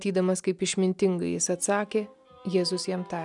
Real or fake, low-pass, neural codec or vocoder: real; 10.8 kHz; none